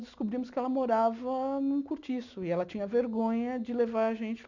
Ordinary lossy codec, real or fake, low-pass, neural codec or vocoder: none; real; 7.2 kHz; none